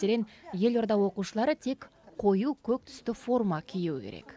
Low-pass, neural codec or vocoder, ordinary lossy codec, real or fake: none; none; none; real